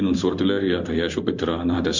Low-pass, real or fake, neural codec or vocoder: 7.2 kHz; fake; codec, 16 kHz in and 24 kHz out, 1 kbps, XY-Tokenizer